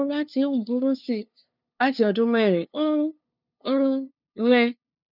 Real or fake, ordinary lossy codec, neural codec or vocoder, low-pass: fake; none; codec, 16 kHz, 2 kbps, FunCodec, trained on LibriTTS, 25 frames a second; 5.4 kHz